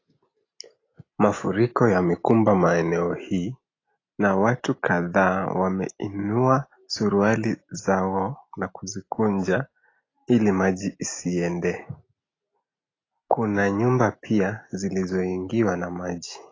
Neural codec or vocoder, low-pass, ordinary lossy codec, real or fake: none; 7.2 kHz; AAC, 32 kbps; real